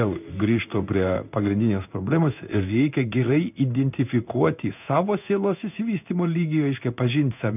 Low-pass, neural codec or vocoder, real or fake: 3.6 kHz; codec, 16 kHz in and 24 kHz out, 1 kbps, XY-Tokenizer; fake